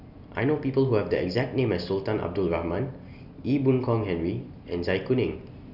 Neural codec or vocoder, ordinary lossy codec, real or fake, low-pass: none; none; real; 5.4 kHz